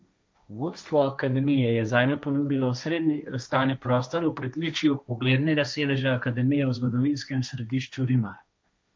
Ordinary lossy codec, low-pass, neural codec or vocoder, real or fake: none; none; codec, 16 kHz, 1.1 kbps, Voila-Tokenizer; fake